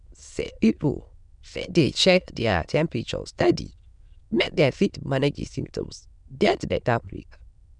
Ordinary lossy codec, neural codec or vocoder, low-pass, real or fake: none; autoencoder, 22.05 kHz, a latent of 192 numbers a frame, VITS, trained on many speakers; 9.9 kHz; fake